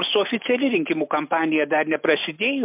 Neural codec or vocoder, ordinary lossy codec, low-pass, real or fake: none; MP3, 32 kbps; 3.6 kHz; real